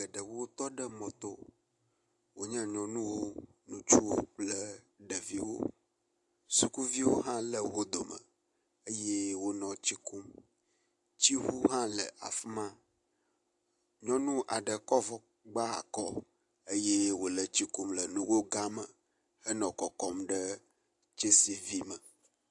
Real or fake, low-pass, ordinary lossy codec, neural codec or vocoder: real; 10.8 kHz; AAC, 64 kbps; none